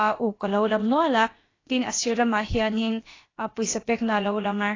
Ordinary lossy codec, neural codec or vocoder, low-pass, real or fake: AAC, 32 kbps; codec, 16 kHz, about 1 kbps, DyCAST, with the encoder's durations; 7.2 kHz; fake